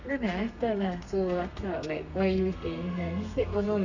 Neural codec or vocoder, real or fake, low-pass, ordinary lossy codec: codec, 32 kHz, 1.9 kbps, SNAC; fake; 7.2 kHz; none